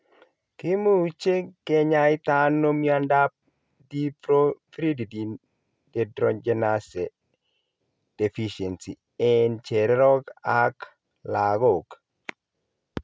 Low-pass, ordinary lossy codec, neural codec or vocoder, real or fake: none; none; none; real